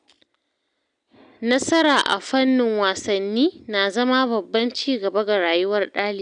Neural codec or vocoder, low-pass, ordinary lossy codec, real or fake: none; 9.9 kHz; none; real